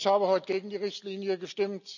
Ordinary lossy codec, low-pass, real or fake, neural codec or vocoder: none; 7.2 kHz; real; none